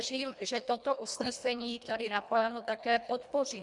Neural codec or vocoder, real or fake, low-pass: codec, 24 kHz, 1.5 kbps, HILCodec; fake; 10.8 kHz